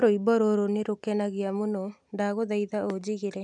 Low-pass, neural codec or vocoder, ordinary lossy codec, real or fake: 10.8 kHz; none; MP3, 96 kbps; real